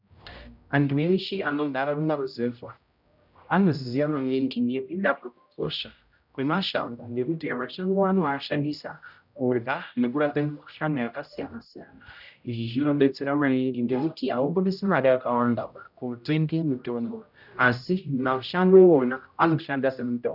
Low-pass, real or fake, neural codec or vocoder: 5.4 kHz; fake; codec, 16 kHz, 0.5 kbps, X-Codec, HuBERT features, trained on general audio